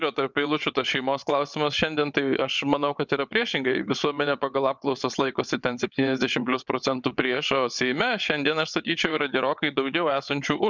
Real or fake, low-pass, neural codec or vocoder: fake; 7.2 kHz; vocoder, 22.05 kHz, 80 mel bands, WaveNeXt